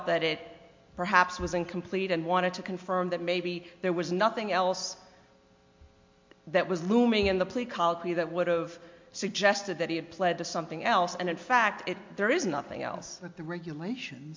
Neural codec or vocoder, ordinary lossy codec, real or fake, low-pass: none; MP3, 48 kbps; real; 7.2 kHz